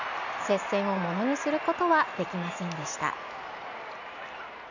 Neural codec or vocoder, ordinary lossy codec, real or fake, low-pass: none; none; real; 7.2 kHz